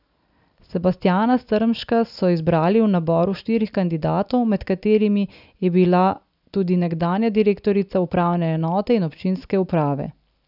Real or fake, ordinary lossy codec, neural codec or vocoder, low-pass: real; none; none; 5.4 kHz